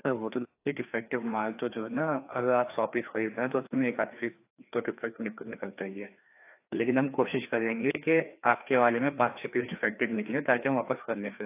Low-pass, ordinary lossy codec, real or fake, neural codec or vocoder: 3.6 kHz; AAC, 24 kbps; fake; codec, 16 kHz, 2 kbps, FreqCodec, larger model